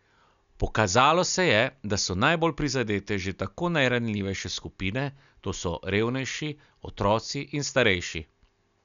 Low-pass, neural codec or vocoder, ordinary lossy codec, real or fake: 7.2 kHz; none; none; real